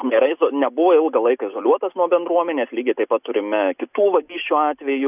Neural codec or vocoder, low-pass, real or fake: none; 3.6 kHz; real